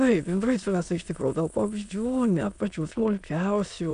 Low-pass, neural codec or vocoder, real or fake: 9.9 kHz; autoencoder, 22.05 kHz, a latent of 192 numbers a frame, VITS, trained on many speakers; fake